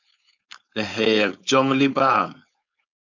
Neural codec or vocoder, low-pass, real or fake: codec, 16 kHz, 4.8 kbps, FACodec; 7.2 kHz; fake